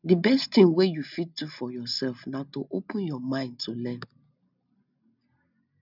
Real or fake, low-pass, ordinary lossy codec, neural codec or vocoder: real; 5.4 kHz; none; none